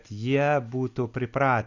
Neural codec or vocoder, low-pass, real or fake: none; 7.2 kHz; real